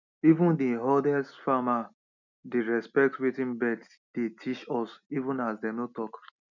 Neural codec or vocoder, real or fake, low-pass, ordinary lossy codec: none; real; 7.2 kHz; none